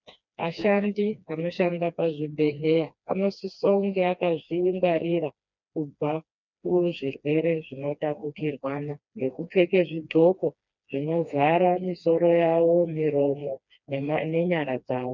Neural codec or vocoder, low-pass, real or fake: codec, 16 kHz, 2 kbps, FreqCodec, smaller model; 7.2 kHz; fake